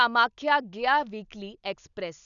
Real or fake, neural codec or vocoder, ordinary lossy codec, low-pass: real; none; none; 7.2 kHz